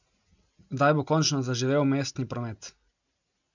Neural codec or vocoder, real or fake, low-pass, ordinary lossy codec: none; real; 7.2 kHz; none